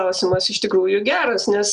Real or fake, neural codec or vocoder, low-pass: real; none; 10.8 kHz